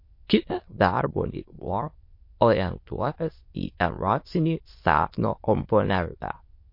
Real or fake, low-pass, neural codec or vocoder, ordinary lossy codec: fake; 5.4 kHz; autoencoder, 22.05 kHz, a latent of 192 numbers a frame, VITS, trained on many speakers; MP3, 32 kbps